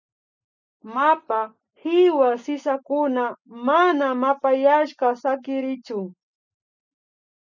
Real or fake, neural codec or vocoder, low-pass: real; none; 7.2 kHz